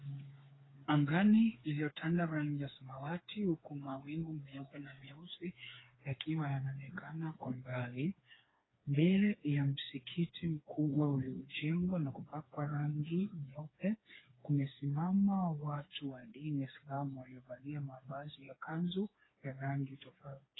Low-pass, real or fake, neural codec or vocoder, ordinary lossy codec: 7.2 kHz; fake; codec, 16 kHz, 4 kbps, FreqCodec, smaller model; AAC, 16 kbps